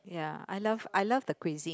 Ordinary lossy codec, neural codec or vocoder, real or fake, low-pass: none; none; real; none